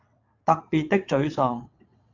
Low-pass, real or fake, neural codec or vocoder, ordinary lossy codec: 7.2 kHz; fake; vocoder, 22.05 kHz, 80 mel bands, WaveNeXt; AAC, 48 kbps